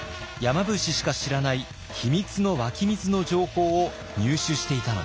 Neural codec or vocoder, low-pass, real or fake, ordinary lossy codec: none; none; real; none